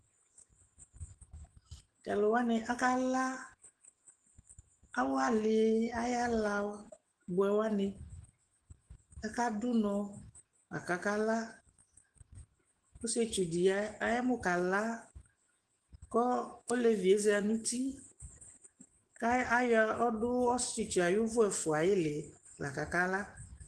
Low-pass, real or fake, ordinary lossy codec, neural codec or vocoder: 10.8 kHz; fake; Opus, 16 kbps; autoencoder, 48 kHz, 128 numbers a frame, DAC-VAE, trained on Japanese speech